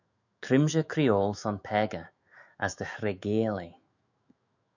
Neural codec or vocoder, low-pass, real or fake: autoencoder, 48 kHz, 128 numbers a frame, DAC-VAE, trained on Japanese speech; 7.2 kHz; fake